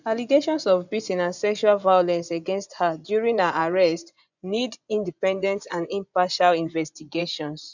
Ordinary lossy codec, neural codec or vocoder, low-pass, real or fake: none; vocoder, 24 kHz, 100 mel bands, Vocos; 7.2 kHz; fake